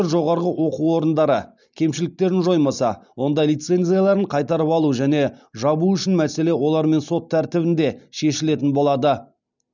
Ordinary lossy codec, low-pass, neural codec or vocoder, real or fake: none; 7.2 kHz; none; real